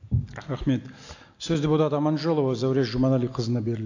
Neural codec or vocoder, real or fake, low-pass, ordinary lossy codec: none; real; 7.2 kHz; AAC, 48 kbps